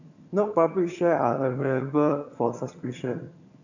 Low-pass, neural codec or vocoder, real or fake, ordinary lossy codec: 7.2 kHz; vocoder, 22.05 kHz, 80 mel bands, HiFi-GAN; fake; none